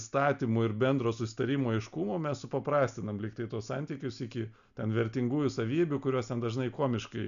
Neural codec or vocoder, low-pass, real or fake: none; 7.2 kHz; real